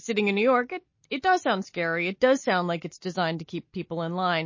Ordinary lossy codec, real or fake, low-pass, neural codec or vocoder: MP3, 32 kbps; real; 7.2 kHz; none